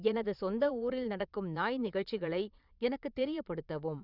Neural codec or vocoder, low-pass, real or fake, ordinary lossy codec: vocoder, 22.05 kHz, 80 mel bands, Vocos; 5.4 kHz; fake; none